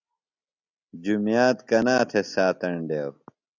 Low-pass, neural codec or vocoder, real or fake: 7.2 kHz; none; real